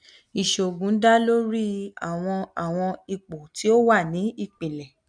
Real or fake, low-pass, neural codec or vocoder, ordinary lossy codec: real; 9.9 kHz; none; none